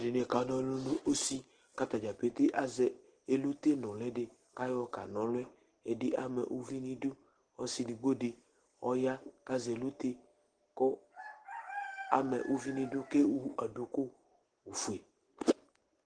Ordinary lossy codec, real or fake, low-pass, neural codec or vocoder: Opus, 16 kbps; real; 9.9 kHz; none